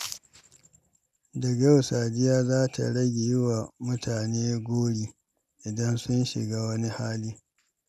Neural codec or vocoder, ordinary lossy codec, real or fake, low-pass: none; none; real; 14.4 kHz